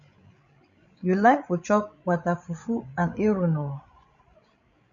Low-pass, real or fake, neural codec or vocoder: 7.2 kHz; fake; codec, 16 kHz, 16 kbps, FreqCodec, larger model